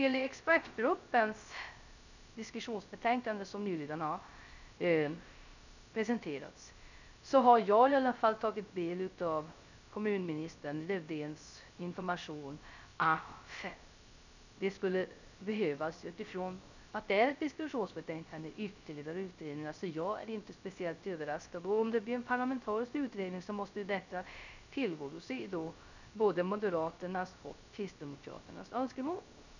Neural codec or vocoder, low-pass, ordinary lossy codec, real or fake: codec, 16 kHz, 0.3 kbps, FocalCodec; 7.2 kHz; none; fake